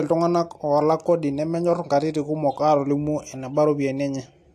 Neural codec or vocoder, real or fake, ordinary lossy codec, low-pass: none; real; AAC, 64 kbps; 14.4 kHz